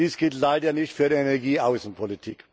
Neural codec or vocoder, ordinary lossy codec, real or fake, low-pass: none; none; real; none